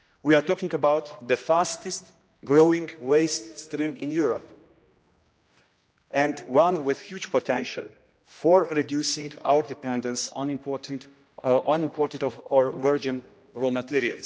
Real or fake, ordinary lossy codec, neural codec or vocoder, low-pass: fake; none; codec, 16 kHz, 1 kbps, X-Codec, HuBERT features, trained on general audio; none